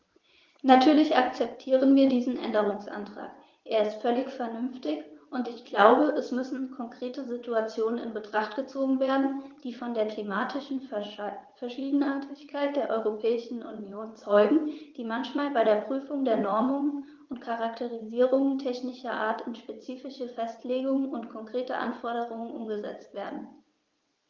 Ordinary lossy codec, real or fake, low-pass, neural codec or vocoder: Opus, 32 kbps; fake; 7.2 kHz; vocoder, 22.05 kHz, 80 mel bands, WaveNeXt